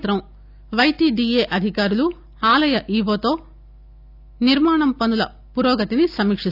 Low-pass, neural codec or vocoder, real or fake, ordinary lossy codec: 5.4 kHz; none; real; none